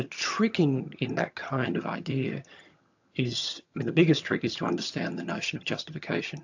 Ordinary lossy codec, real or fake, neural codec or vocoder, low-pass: AAC, 48 kbps; fake; vocoder, 22.05 kHz, 80 mel bands, HiFi-GAN; 7.2 kHz